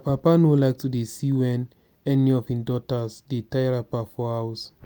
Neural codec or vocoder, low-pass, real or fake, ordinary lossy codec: none; none; real; none